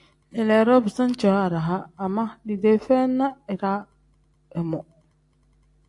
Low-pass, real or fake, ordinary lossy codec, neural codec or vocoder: 10.8 kHz; real; AAC, 64 kbps; none